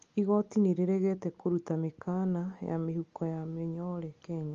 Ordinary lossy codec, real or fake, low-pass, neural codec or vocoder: Opus, 32 kbps; real; 7.2 kHz; none